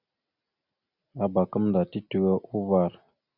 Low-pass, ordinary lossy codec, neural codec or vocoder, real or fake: 5.4 kHz; Opus, 64 kbps; none; real